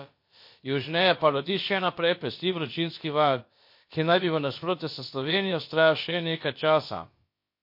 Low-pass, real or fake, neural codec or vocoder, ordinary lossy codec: 5.4 kHz; fake; codec, 16 kHz, about 1 kbps, DyCAST, with the encoder's durations; MP3, 32 kbps